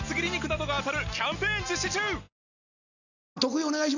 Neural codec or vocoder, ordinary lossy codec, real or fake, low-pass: none; none; real; 7.2 kHz